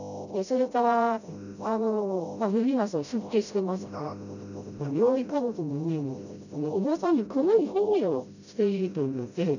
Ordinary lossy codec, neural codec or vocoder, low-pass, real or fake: none; codec, 16 kHz, 0.5 kbps, FreqCodec, smaller model; 7.2 kHz; fake